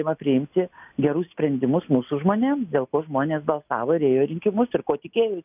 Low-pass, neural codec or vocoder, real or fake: 3.6 kHz; none; real